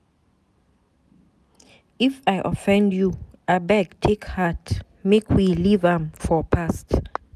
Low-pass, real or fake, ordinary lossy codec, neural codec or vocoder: 14.4 kHz; real; none; none